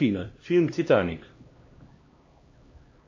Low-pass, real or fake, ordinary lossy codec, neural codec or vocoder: 7.2 kHz; fake; MP3, 32 kbps; codec, 16 kHz, 1 kbps, X-Codec, HuBERT features, trained on LibriSpeech